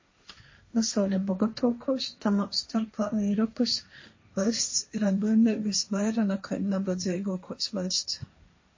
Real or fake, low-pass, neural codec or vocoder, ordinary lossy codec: fake; 7.2 kHz; codec, 16 kHz, 1.1 kbps, Voila-Tokenizer; MP3, 32 kbps